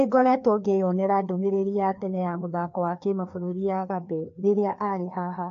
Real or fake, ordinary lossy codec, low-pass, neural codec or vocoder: fake; AAC, 48 kbps; 7.2 kHz; codec, 16 kHz, 2 kbps, FreqCodec, larger model